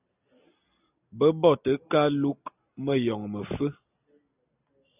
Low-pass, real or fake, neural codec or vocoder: 3.6 kHz; real; none